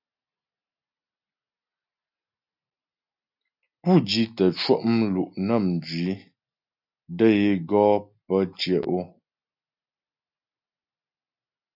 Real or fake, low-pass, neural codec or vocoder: real; 5.4 kHz; none